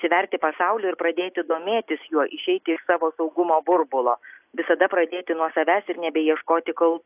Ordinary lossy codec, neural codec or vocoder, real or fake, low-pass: AAC, 32 kbps; none; real; 3.6 kHz